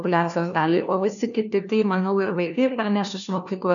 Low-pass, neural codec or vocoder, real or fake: 7.2 kHz; codec, 16 kHz, 1 kbps, FunCodec, trained on LibriTTS, 50 frames a second; fake